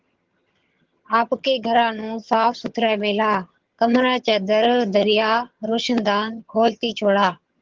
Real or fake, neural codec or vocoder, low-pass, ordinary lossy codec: fake; vocoder, 22.05 kHz, 80 mel bands, HiFi-GAN; 7.2 kHz; Opus, 16 kbps